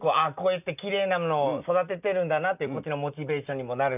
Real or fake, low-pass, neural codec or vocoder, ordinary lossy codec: real; 3.6 kHz; none; none